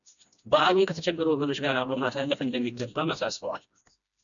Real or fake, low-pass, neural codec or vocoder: fake; 7.2 kHz; codec, 16 kHz, 1 kbps, FreqCodec, smaller model